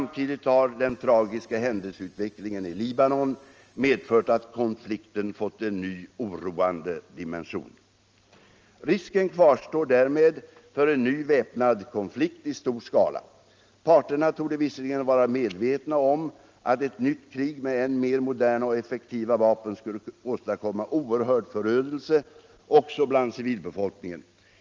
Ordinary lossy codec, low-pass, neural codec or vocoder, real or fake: Opus, 24 kbps; 7.2 kHz; none; real